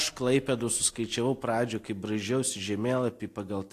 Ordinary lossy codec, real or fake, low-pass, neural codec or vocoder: AAC, 64 kbps; real; 14.4 kHz; none